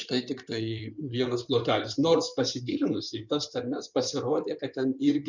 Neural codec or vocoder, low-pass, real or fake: codec, 16 kHz in and 24 kHz out, 2.2 kbps, FireRedTTS-2 codec; 7.2 kHz; fake